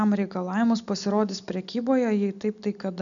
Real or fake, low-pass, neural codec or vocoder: real; 7.2 kHz; none